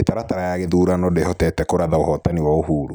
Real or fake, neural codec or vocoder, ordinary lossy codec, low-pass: real; none; none; none